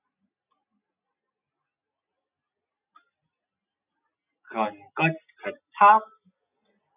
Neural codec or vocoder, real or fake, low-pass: none; real; 3.6 kHz